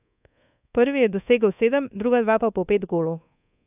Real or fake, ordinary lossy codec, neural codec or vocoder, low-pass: fake; AAC, 32 kbps; codec, 24 kHz, 1.2 kbps, DualCodec; 3.6 kHz